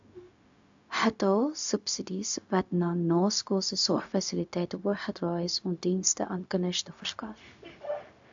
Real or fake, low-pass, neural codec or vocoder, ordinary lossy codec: fake; 7.2 kHz; codec, 16 kHz, 0.4 kbps, LongCat-Audio-Codec; AAC, 64 kbps